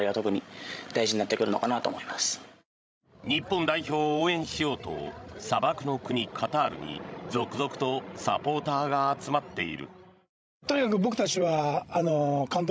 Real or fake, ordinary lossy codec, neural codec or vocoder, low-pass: fake; none; codec, 16 kHz, 16 kbps, FreqCodec, larger model; none